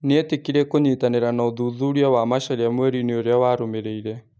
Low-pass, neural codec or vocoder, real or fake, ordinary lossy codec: none; none; real; none